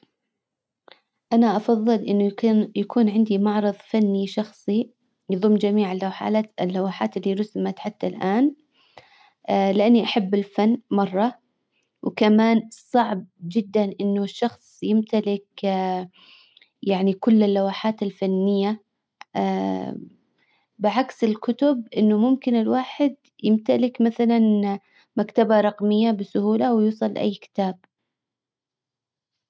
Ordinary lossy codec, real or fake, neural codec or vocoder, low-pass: none; real; none; none